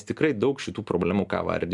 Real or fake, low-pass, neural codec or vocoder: real; 10.8 kHz; none